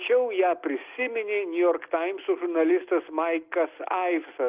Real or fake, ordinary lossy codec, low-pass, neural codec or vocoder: real; Opus, 32 kbps; 3.6 kHz; none